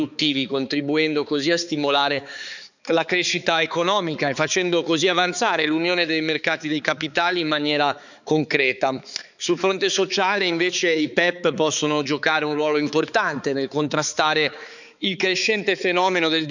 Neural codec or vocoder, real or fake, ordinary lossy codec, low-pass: codec, 16 kHz, 4 kbps, X-Codec, HuBERT features, trained on balanced general audio; fake; none; 7.2 kHz